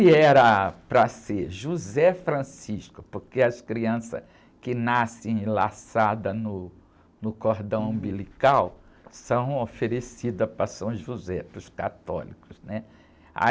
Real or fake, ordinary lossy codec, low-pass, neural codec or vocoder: real; none; none; none